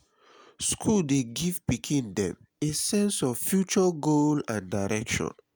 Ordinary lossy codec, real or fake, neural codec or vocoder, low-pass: none; real; none; none